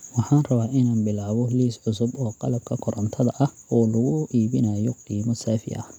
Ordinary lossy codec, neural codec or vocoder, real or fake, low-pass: none; none; real; 19.8 kHz